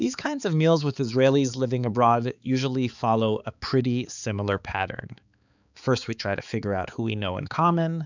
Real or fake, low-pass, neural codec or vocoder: fake; 7.2 kHz; codec, 16 kHz, 4 kbps, X-Codec, HuBERT features, trained on balanced general audio